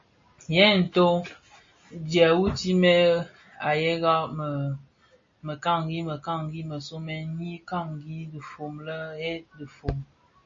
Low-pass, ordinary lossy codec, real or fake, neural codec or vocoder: 7.2 kHz; MP3, 32 kbps; real; none